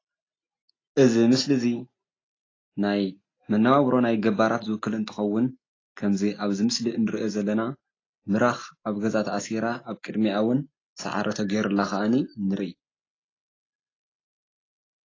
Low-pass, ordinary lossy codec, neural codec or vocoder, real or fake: 7.2 kHz; AAC, 32 kbps; none; real